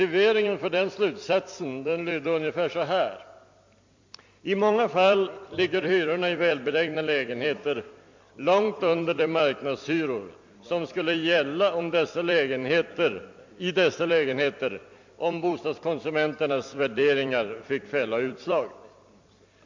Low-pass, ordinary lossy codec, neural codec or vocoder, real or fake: 7.2 kHz; MP3, 48 kbps; vocoder, 44.1 kHz, 128 mel bands every 256 samples, BigVGAN v2; fake